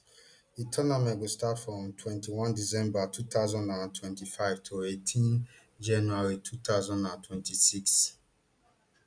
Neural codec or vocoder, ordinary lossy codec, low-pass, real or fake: none; none; 9.9 kHz; real